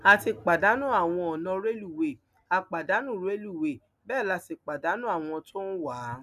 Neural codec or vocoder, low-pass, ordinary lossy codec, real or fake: none; 14.4 kHz; none; real